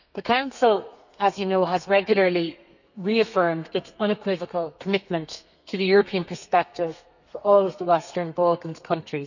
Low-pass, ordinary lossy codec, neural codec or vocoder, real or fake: 7.2 kHz; none; codec, 32 kHz, 1.9 kbps, SNAC; fake